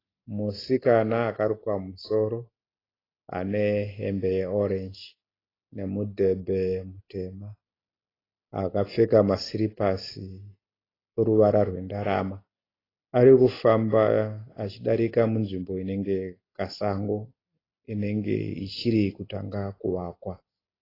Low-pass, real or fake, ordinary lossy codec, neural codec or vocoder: 5.4 kHz; real; AAC, 24 kbps; none